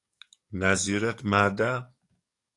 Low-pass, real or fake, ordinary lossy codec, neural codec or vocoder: 10.8 kHz; fake; AAC, 48 kbps; codec, 44.1 kHz, 7.8 kbps, DAC